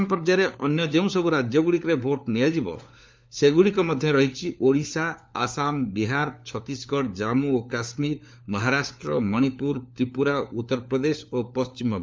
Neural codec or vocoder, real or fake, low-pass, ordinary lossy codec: codec, 16 kHz, 4 kbps, FunCodec, trained on LibriTTS, 50 frames a second; fake; none; none